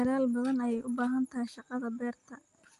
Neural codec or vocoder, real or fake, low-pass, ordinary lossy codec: none; real; 10.8 kHz; Opus, 32 kbps